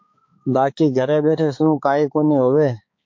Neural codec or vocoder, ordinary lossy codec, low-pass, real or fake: codec, 16 kHz, 4 kbps, X-Codec, HuBERT features, trained on balanced general audio; MP3, 48 kbps; 7.2 kHz; fake